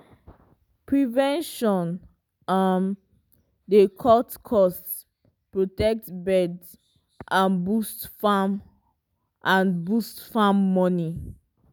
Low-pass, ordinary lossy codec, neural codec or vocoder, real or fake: none; none; none; real